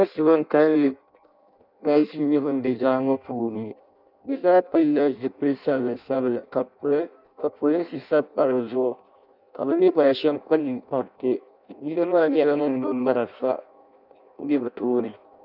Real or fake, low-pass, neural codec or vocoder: fake; 5.4 kHz; codec, 16 kHz in and 24 kHz out, 0.6 kbps, FireRedTTS-2 codec